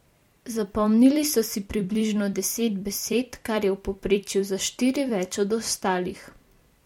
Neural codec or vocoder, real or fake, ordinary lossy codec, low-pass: vocoder, 44.1 kHz, 128 mel bands every 256 samples, BigVGAN v2; fake; MP3, 64 kbps; 19.8 kHz